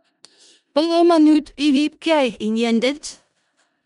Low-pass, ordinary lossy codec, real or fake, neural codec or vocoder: 10.8 kHz; none; fake; codec, 16 kHz in and 24 kHz out, 0.4 kbps, LongCat-Audio-Codec, four codebook decoder